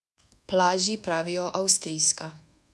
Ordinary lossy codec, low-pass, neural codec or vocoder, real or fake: none; none; codec, 24 kHz, 1.2 kbps, DualCodec; fake